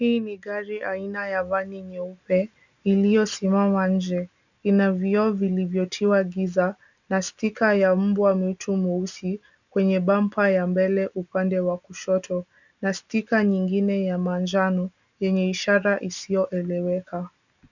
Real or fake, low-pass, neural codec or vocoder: real; 7.2 kHz; none